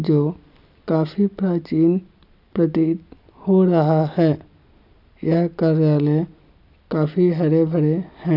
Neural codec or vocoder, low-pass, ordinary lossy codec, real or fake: none; 5.4 kHz; none; real